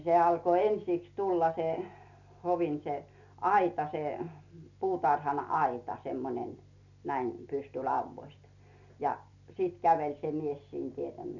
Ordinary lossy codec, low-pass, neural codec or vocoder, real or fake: none; 7.2 kHz; none; real